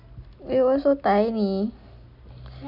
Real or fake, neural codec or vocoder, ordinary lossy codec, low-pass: real; none; none; 5.4 kHz